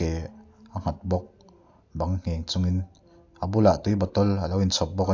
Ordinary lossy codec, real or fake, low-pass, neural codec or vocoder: AAC, 48 kbps; real; 7.2 kHz; none